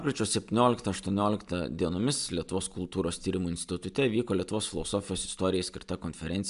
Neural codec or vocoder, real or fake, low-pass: none; real; 10.8 kHz